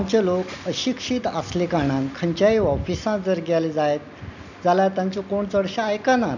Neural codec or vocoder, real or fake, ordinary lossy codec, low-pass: none; real; none; 7.2 kHz